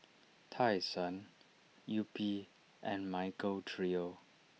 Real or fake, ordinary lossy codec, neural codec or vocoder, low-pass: real; none; none; none